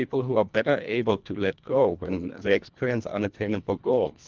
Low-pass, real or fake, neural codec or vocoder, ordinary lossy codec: 7.2 kHz; fake; codec, 24 kHz, 1.5 kbps, HILCodec; Opus, 32 kbps